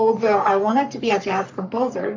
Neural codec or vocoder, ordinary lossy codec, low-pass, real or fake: codec, 44.1 kHz, 3.4 kbps, Pupu-Codec; MP3, 64 kbps; 7.2 kHz; fake